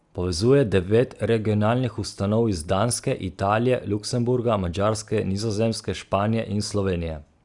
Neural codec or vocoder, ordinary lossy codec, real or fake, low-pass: none; Opus, 64 kbps; real; 10.8 kHz